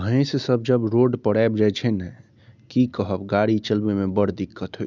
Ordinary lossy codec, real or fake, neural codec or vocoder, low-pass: none; real; none; 7.2 kHz